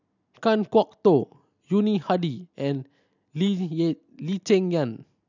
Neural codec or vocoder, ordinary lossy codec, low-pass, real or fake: none; none; 7.2 kHz; real